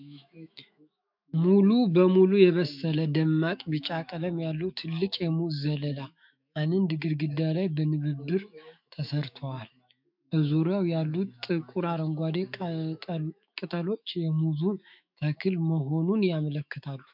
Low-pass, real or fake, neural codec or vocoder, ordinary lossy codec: 5.4 kHz; fake; autoencoder, 48 kHz, 128 numbers a frame, DAC-VAE, trained on Japanese speech; MP3, 48 kbps